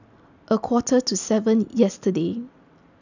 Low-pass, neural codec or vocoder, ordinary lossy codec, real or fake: 7.2 kHz; none; none; real